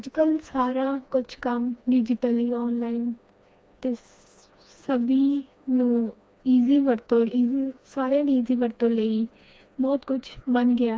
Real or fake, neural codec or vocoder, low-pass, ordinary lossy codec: fake; codec, 16 kHz, 2 kbps, FreqCodec, smaller model; none; none